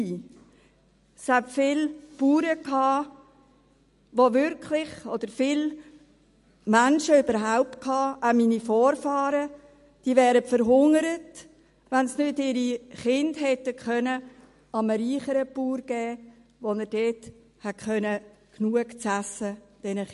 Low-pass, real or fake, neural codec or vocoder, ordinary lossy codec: 14.4 kHz; real; none; MP3, 48 kbps